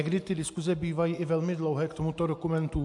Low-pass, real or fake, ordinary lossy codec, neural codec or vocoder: 10.8 kHz; real; AAC, 64 kbps; none